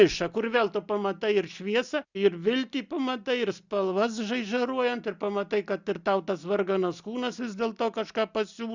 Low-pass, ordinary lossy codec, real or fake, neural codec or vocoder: 7.2 kHz; Opus, 64 kbps; real; none